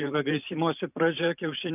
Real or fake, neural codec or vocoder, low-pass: fake; vocoder, 44.1 kHz, 128 mel bands every 512 samples, BigVGAN v2; 3.6 kHz